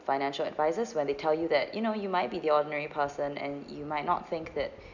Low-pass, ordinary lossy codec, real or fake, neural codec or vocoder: 7.2 kHz; none; real; none